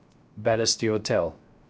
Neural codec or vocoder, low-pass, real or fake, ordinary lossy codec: codec, 16 kHz, 0.3 kbps, FocalCodec; none; fake; none